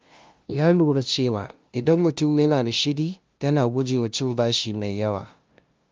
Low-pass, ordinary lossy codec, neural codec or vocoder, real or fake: 7.2 kHz; Opus, 32 kbps; codec, 16 kHz, 0.5 kbps, FunCodec, trained on LibriTTS, 25 frames a second; fake